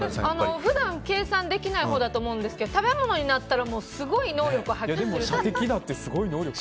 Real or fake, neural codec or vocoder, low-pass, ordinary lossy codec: real; none; none; none